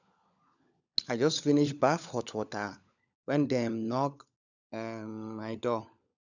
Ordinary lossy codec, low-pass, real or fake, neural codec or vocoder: none; 7.2 kHz; fake; codec, 16 kHz, 16 kbps, FunCodec, trained on LibriTTS, 50 frames a second